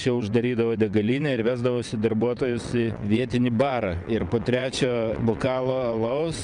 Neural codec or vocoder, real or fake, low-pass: vocoder, 22.05 kHz, 80 mel bands, WaveNeXt; fake; 9.9 kHz